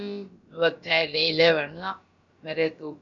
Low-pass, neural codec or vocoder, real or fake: 7.2 kHz; codec, 16 kHz, about 1 kbps, DyCAST, with the encoder's durations; fake